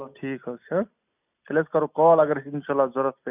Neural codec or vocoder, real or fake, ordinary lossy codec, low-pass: none; real; none; 3.6 kHz